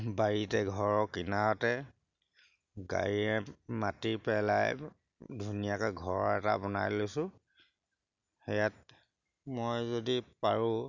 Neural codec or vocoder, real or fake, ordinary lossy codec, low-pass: none; real; none; 7.2 kHz